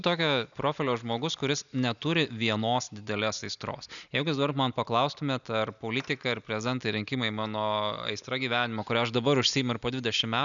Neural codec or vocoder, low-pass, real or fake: none; 7.2 kHz; real